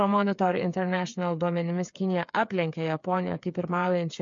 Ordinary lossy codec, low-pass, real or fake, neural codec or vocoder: MP3, 48 kbps; 7.2 kHz; fake; codec, 16 kHz, 8 kbps, FreqCodec, smaller model